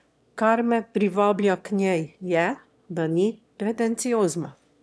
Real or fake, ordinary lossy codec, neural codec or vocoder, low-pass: fake; none; autoencoder, 22.05 kHz, a latent of 192 numbers a frame, VITS, trained on one speaker; none